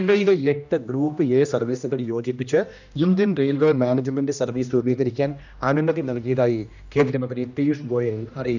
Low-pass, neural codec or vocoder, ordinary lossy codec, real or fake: 7.2 kHz; codec, 16 kHz, 1 kbps, X-Codec, HuBERT features, trained on general audio; none; fake